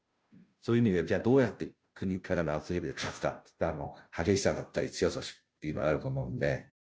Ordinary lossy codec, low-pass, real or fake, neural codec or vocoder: none; none; fake; codec, 16 kHz, 0.5 kbps, FunCodec, trained on Chinese and English, 25 frames a second